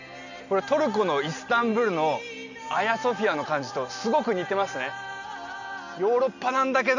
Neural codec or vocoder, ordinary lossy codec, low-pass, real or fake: none; none; 7.2 kHz; real